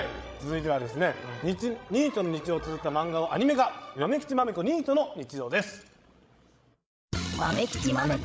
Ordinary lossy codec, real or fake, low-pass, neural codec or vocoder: none; fake; none; codec, 16 kHz, 16 kbps, FreqCodec, larger model